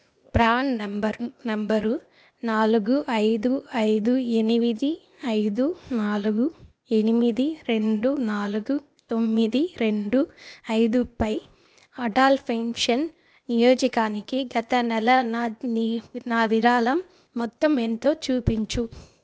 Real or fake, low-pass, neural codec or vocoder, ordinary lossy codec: fake; none; codec, 16 kHz, 0.8 kbps, ZipCodec; none